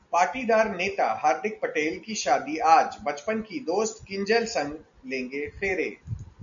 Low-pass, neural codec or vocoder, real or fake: 7.2 kHz; none; real